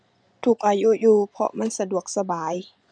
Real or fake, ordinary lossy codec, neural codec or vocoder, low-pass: fake; none; vocoder, 24 kHz, 100 mel bands, Vocos; 9.9 kHz